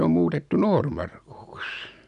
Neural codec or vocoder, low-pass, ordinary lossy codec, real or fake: none; 10.8 kHz; none; real